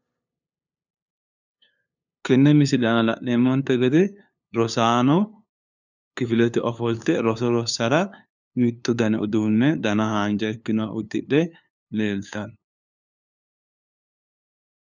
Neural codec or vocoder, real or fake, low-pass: codec, 16 kHz, 2 kbps, FunCodec, trained on LibriTTS, 25 frames a second; fake; 7.2 kHz